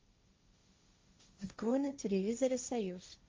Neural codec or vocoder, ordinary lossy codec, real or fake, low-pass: codec, 16 kHz, 1.1 kbps, Voila-Tokenizer; Opus, 32 kbps; fake; 7.2 kHz